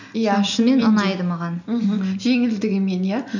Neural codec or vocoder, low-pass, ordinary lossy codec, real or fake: none; 7.2 kHz; none; real